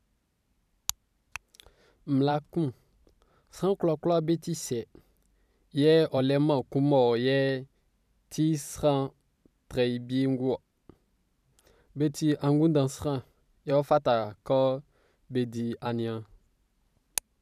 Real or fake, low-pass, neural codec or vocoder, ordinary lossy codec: fake; 14.4 kHz; vocoder, 44.1 kHz, 128 mel bands every 512 samples, BigVGAN v2; none